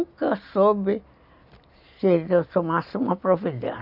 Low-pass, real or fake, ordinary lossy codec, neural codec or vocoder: 5.4 kHz; real; none; none